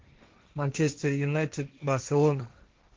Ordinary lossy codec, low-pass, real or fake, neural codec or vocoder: Opus, 16 kbps; 7.2 kHz; fake; codec, 16 kHz, 1.1 kbps, Voila-Tokenizer